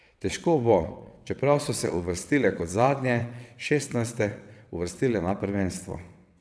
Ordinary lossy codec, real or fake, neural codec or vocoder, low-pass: none; fake; vocoder, 22.05 kHz, 80 mel bands, WaveNeXt; none